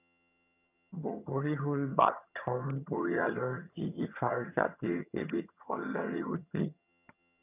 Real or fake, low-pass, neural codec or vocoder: fake; 3.6 kHz; vocoder, 22.05 kHz, 80 mel bands, HiFi-GAN